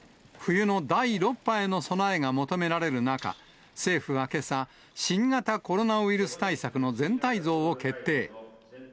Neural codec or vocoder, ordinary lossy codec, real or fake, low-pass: none; none; real; none